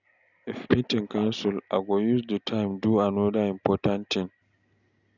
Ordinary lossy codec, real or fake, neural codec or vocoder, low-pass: none; real; none; 7.2 kHz